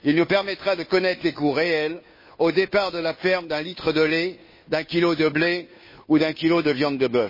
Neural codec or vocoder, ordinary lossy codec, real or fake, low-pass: codec, 16 kHz, 2 kbps, FunCodec, trained on Chinese and English, 25 frames a second; MP3, 24 kbps; fake; 5.4 kHz